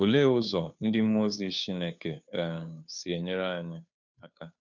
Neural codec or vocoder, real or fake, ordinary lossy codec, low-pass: codec, 16 kHz, 2 kbps, FunCodec, trained on Chinese and English, 25 frames a second; fake; none; 7.2 kHz